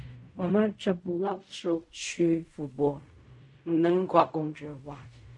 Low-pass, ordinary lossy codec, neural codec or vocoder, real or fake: 10.8 kHz; MP3, 48 kbps; codec, 16 kHz in and 24 kHz out, 0.4 kbps, LongCat-Audio-Codec, fine tuned four codebook decoder; fake